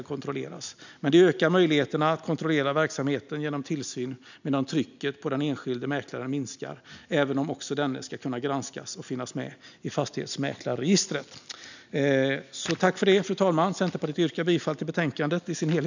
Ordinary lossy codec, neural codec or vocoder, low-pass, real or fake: none; none; 7.2 kHz; real